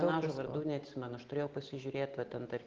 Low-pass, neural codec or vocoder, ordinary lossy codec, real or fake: 7.2 kHz; none; Opus, 16 kbps; real